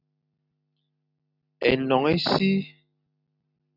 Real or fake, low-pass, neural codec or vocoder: real; 5.4 kHz; none